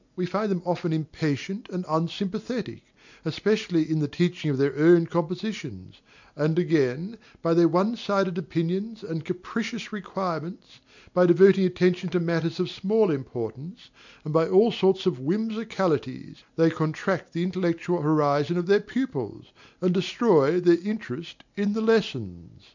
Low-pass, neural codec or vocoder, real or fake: 7.2 kHz; none; real